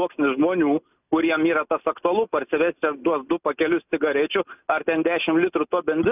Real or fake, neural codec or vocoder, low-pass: real; none; 3.6 kHz